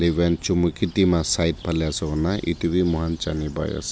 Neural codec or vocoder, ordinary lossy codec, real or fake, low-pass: none; none; real; none